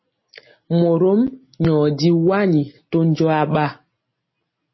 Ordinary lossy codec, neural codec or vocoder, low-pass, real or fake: MP3, 24 kbps; none; 7.2 kHz; real